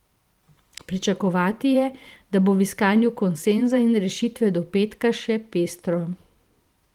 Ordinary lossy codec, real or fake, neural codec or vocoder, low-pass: Opus, 24 kbps; fake; vocoder, 44.1 kHz, 128 mel bands every 512 samples, BigVGAN v2; 19.8 kHz